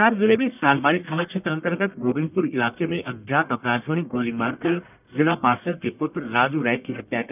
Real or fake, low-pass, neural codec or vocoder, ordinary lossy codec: fake; 3.6 kHz; codec, 44.1 kHz, 1.7 kbps, Pupu-Codec; none